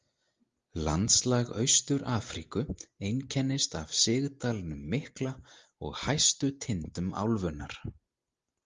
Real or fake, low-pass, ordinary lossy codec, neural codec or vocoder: real; 7.2 kHz; Opus, 32 kbps; none